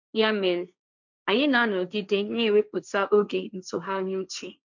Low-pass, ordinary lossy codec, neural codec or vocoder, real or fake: 7.2 kHz; none; codec, 16 kHz, 1.1 kbps, Voila-Tokenizer; fake